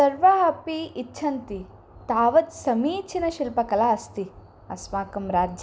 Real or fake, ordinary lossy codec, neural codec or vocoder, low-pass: real; none; none; none